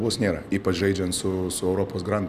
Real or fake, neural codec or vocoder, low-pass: real; none; 14.4 kHz